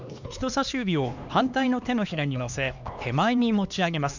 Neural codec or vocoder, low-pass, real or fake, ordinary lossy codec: codec, 16 kHz, 2 kbps, X-Codec, HuBERT features, trained on LibriSpeech; 7.2 kHz; fake; none